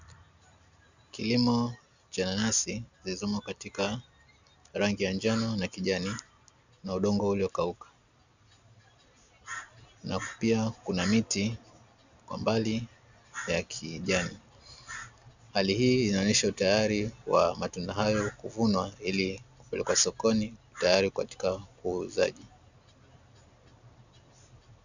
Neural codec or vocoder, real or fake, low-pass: none; real; 7.2 kHz